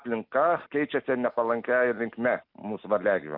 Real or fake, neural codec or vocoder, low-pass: real; none; 5.4 kHz